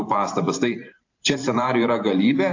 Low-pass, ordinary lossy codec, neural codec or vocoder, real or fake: 7.2 kHz; AAC, 48 kbps; vocoder, 44.1 kHz, 128 mel bands every 512 samples, BigVGAN v2; fake